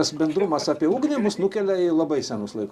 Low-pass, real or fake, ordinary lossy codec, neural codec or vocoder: 14.4 kHz; real; Opus, 64 kbps; none